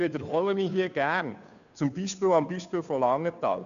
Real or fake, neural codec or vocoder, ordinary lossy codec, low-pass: fake; codec, 16 kHz, 2 kbps, FunCodec, trained on Chinese and English, 25 frames a second; none; 7.2 kHz